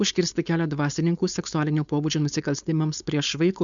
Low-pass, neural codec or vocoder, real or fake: 7.2 kHz; codec, 16 kHz, 4.8 kbps, FACodec; fake